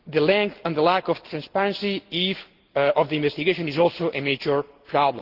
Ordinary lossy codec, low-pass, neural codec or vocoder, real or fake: Opus, 16 kbps; 5.4 kHz; none; real